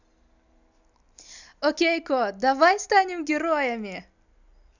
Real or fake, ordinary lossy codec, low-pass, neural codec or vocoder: real; none; 7.2 kHz; none